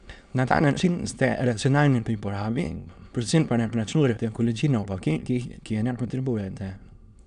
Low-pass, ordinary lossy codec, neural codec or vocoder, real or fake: 9.9 kHz; none; autoencoder, 22.05 kHz, a latent of 192 numbers a frame, VITS, trained on many speakers; fake